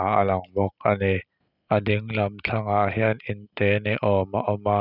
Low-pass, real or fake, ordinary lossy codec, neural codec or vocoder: 5.4 kHz; real; none; none